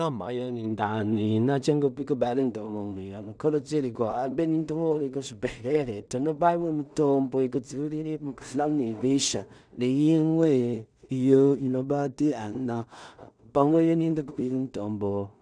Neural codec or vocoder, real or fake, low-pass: codec, 16 kHz in and 24 kHz out, 0.4 kbps, LongCat-Audio-Codec, two codebook decoder; fake; 9.9 kHz